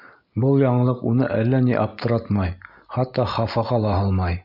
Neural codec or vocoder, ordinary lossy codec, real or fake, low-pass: none; MP3, 48 kbps; real; 5.4 kHz